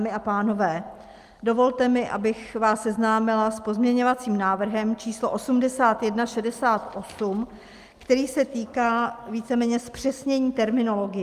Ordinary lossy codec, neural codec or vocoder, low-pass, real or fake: Opus, 32 kbps; none; 14.4 kHz; real